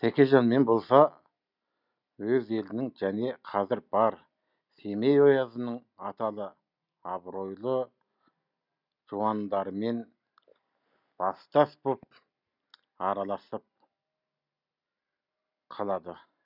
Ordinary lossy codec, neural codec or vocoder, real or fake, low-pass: none; none; real; 5.4 kHz